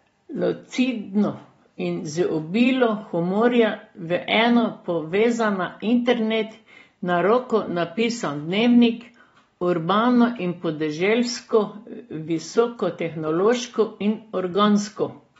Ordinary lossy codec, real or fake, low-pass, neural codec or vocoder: AAC, 24 kbps; real; 10.8 kHz; none